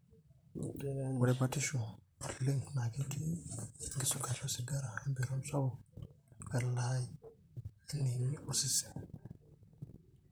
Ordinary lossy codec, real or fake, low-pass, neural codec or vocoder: none; fake; none; vocoder, 44.1 kHz, 128 mel bands, Pupu-Vocoder